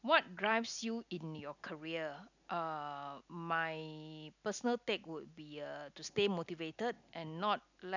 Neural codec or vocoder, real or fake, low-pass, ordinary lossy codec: none; real; 7.2 kHz; none